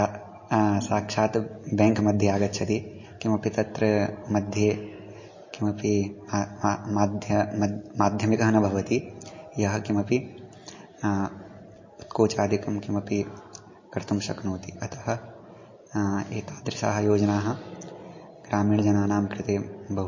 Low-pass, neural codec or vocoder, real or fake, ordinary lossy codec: 7.2 kHz; none; real; MP3, 32 kbps